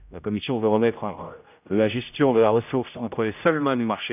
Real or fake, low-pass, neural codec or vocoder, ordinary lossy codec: fake; 3.6 kHz; codec, 16 kHz, 0.5 kbps, X-Codec, HuBERT features, trained on general audio; none